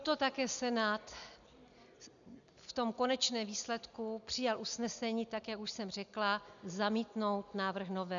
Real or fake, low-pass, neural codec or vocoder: real; 7.2 kHz; none